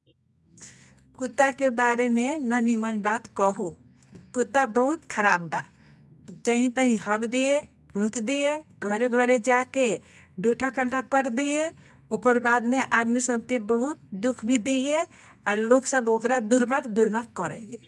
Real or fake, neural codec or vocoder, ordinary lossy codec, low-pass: fake; codec, 24 kHz, 0.9 kbps, WavTokenizer, medium music audio release; none; none